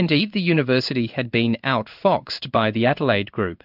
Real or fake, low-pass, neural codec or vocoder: fake; 5.4 kHz; codec, 16 kHz in and 24 kHz out, 1 kbps, XY-Tokenizer